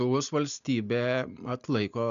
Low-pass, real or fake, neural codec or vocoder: 7.2 kHz; real; none